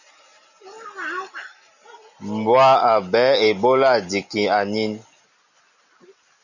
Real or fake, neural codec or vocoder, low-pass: real; none; 7.2 kHz